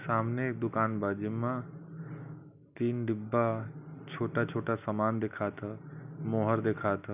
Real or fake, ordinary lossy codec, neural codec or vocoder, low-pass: real; none; none; 3.6 kHz